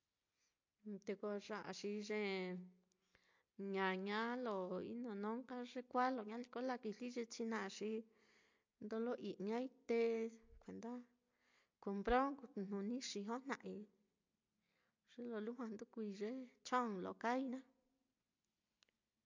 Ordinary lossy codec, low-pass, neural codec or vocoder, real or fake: MP3, 48 kbps; 7.2 kHz; none; real